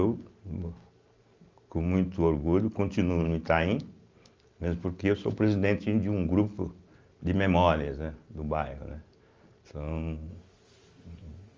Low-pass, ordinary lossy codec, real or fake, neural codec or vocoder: 7.2 kHz; Opus, 32 kbps; real; none